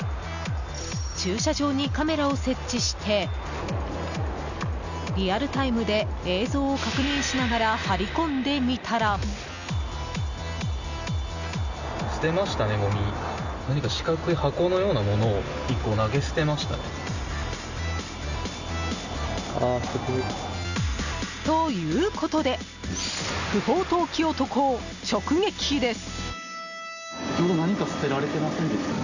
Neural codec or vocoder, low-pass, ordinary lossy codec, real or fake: none; 7.2 kHz; none; real